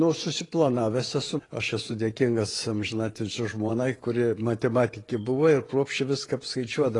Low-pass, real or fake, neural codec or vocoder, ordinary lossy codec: 10.8 kHz; fake; vocoder, 24 kHz, 100 mel bands, Vocos; AAC, 32 kbps